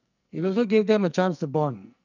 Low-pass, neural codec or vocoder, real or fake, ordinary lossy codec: 7.2 kHz; codec, 44.1 kHz, 2.6 kbps, SNAC; fake; none